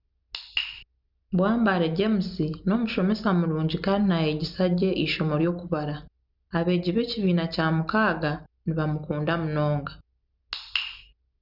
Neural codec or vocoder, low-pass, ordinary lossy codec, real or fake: none; 5.4 kHz; none; real